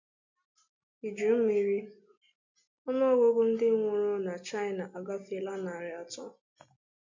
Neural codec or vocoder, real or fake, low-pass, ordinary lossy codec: none; real; 7.2 kHz; MP3, 64 kbps